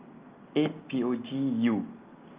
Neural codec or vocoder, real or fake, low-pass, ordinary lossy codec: none; real; 3.6 kHz; Opus, 24 kbps